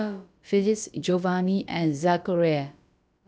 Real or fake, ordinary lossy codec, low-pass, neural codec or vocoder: fake; none; none; codec, 16 kHz, about 1 kbps, DyCAST, with the encoder's durations